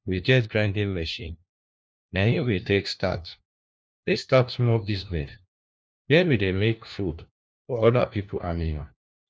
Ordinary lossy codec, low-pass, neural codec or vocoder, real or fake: none; none; codec, 16 kHz, 1 kbps, FunCodec, trained on LibriTTS, 50 frames a second; fake